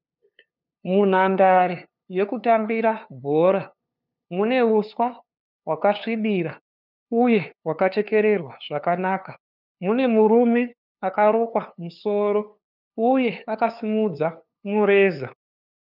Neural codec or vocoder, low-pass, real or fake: codec, 16 kHz, 2 kbps, FunCodec, trained on LibriTTS, 25 frames a second; 5.4 kHz; fake